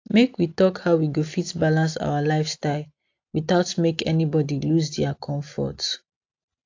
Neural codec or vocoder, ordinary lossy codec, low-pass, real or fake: none; AAC, 48 kbps; 7.2 kHz; real